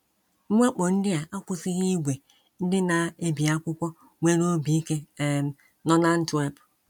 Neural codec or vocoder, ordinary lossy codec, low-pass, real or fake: none; none; 19.8 kHz; real